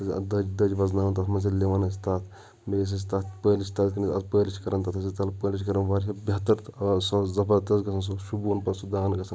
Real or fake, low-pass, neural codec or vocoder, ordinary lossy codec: real; none; none; none